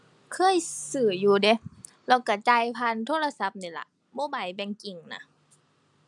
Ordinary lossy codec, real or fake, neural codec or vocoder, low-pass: none; real; none; 10.8 kHz